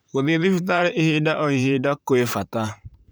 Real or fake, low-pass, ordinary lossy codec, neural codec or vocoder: fake; none; none; vocoder, 44.1 kHz, 128 mel bands, Pupu-Vocoder